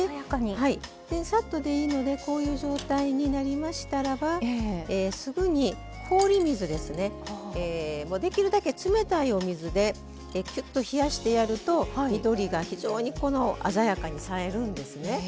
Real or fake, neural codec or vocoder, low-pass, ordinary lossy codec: real; none; none; none